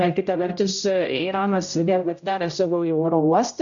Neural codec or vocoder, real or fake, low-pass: codec, 16 kHz, 0.5 kbps, X-Codec, HuBERT features, trained on general audio; fake; 7.2 kHz